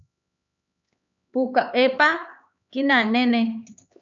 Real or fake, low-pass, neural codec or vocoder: fake; 7.2 kHz; codec, 16 kHz, 4 kbps, X-Codec, HuBERT features, trained on LibriSpeech